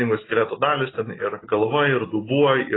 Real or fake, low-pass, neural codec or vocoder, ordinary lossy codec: real; 7.2 kHz; none; AAC, 16 kbps